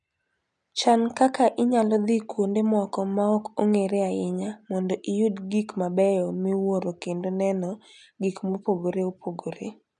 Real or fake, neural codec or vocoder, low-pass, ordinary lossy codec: real; none; 9.9 kHz; none